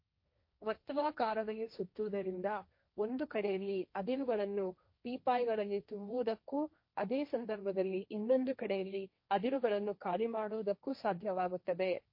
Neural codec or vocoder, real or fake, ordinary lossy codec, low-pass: codec, 16 kHz, 1.1 kbps, Voila-Tokenizer; fake; MP3, 32 kbps; 5.4 kHz